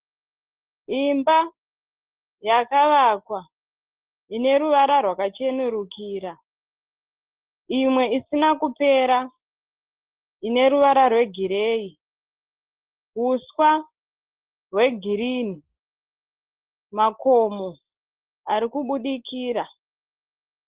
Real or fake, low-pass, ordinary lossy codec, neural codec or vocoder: real; 3.6 kHz; Opus, 16 kbps; none